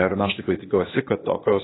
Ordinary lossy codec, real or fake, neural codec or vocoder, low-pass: AAC, 16 kbps; fake; codec, 16 kHz, 0.8 kbps, ZipCodec; 7.2 kHz